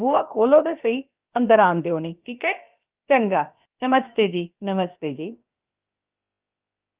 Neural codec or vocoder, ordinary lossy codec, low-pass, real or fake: codec, 16 kHz, about 1 kbps, DyCAST, with the encoder's durations; Opus, 24 kbps; 3.6 kHz; fake